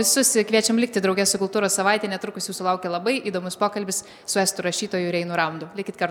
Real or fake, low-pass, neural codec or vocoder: real; 19.8 kHz; none